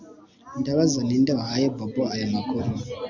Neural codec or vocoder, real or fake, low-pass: none; real; 7.2 kHz